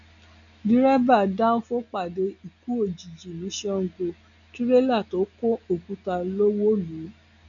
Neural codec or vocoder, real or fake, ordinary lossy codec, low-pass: none; real; none; 7.2 kHz